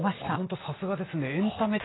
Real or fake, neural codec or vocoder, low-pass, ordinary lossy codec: real; none; 7.2 kHz; AAC, 16 kbps